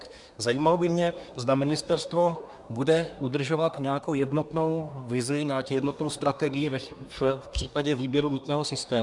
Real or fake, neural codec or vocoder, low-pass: fake; codec, 24 kHz, 1 kbps, SNAC; 10.8 kHz